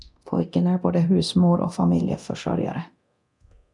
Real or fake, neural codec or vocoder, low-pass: fake; codec, 24 kHz, 0.9 kbps, DualCodec; 10.8 kHz